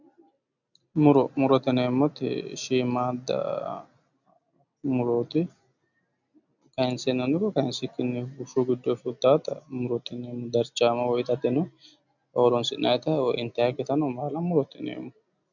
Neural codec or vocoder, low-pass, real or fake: none; 7.2 kHz; real